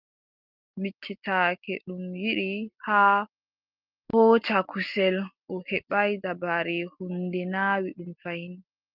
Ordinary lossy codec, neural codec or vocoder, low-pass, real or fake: Opus, 24 kbps; none; 5.4 kHz; real